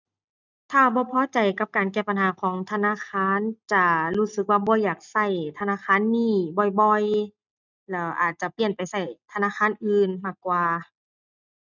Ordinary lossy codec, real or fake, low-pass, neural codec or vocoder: none; real; 7.2 kHz; none